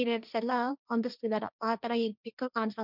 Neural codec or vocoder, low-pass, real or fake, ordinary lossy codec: codec, 16 kHz, 1.1 kbps, Voila-Tokenizer; 5.4 kHz; fake; none